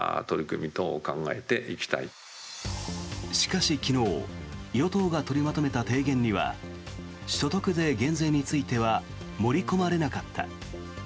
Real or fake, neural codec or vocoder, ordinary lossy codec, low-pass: real; none; none; none